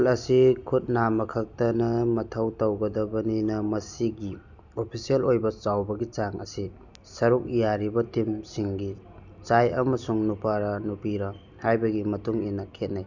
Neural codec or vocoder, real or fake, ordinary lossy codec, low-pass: none; real; none; 7.2 kHz